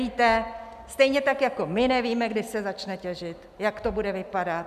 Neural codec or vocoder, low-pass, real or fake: none; 14.4 kHz; real